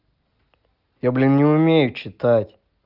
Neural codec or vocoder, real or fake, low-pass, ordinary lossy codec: none; real; 5.4 kHz; Opus, 32 kbps